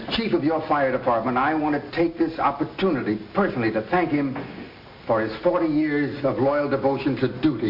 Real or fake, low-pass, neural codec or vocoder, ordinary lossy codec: real; 5.4 kHz; none; MP3, 32 kbps